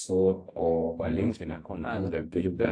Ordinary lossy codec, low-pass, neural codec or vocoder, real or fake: AAC, 48 kbps; 9.9 kHz; codec, 24 kHz, 0.9 kbps, WavTokenizer, medium music audio release; fake